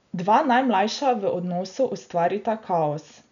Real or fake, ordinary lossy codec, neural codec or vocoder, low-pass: real; none; none; 7.2 kHz